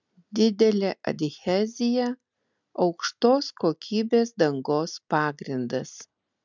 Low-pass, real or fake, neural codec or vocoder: 7.2 kHz; real; none